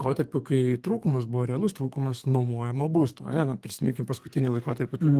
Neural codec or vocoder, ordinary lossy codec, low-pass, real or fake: codec, 32 kHz, 1.9 kbps, SNAC; Opus, 24 kbps; 14.4 kHz; fake